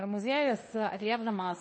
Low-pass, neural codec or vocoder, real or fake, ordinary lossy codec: 9.9 kHz; codec, 16 kHz in and 24 kHz out, 0.9 kbps, LongCat-Audio-Codec, fine tuned four codebook decoder; fake; MP3, 32 kbps